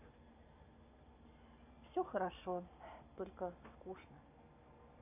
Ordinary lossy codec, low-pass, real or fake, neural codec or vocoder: none; 3.6 kHz; real; none